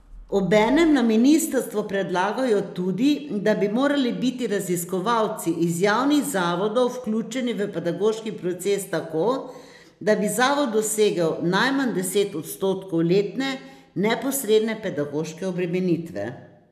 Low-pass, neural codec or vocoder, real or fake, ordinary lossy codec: 14.4 kHz; none; real; none